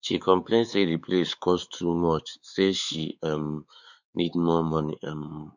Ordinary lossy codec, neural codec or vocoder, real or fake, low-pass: none; codec, 16 kHz, 4 kbps, X-Codec, WavLM features, trained on Multilingual LibriSpeech; fake; 7.2 kHz